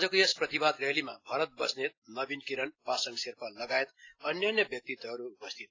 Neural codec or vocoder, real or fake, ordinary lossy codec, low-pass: none; real; AAC, 32 kbps; 7.2 kHz